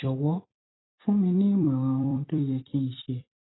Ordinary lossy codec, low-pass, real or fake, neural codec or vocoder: AAC, 16 kbps; 7.2 kHz; fake; vocoder, 44.1 kHz, 128 mel bands every 256 samples, BigVGAN v2